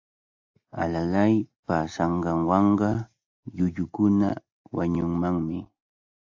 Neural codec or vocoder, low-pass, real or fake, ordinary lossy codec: none; 7.2 kHz; real; AAC, 48 kbps